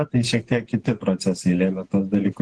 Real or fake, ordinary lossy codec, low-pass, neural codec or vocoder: fake; Opus, 16 kbps; 10.8 kHz; vocoder, 48 kHz, 128 mel bands, Vocos